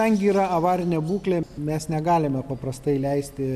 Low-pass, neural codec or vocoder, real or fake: 14.4 kHz; none; real